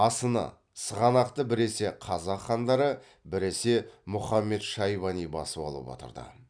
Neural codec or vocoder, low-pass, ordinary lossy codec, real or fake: none; 9.9 kHz; none; real